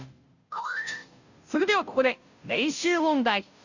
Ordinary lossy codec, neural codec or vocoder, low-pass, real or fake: none; codec, 16 kHz, 0.5 kbps, FunCodec, trained on Chinese and English, 25 frames a second; 7.2 kHz; fake